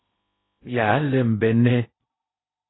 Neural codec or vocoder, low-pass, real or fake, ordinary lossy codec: codec, 16 kHz in and 24 kHz out, 0.8 kbps, FocalCodec, streaming, 65536 codes; 7.2 kHz; fake; AAC, 16 kbps